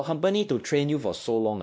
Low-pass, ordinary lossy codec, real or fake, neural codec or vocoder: none; none; fake; codec, 16 kHz, 1 kbps, X-Codec, WavLM features, trained on Multilingual LibriSpeech